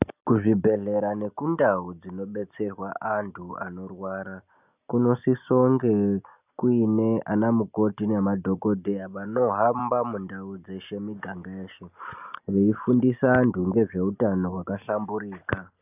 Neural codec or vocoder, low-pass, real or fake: none; 3.6 kHz; real